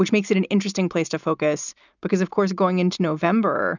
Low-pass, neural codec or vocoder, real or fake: 7.2 kHz; none; real